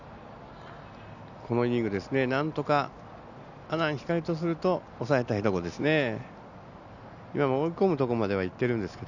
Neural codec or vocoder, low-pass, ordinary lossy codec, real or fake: none; 7.2 kHz; none; real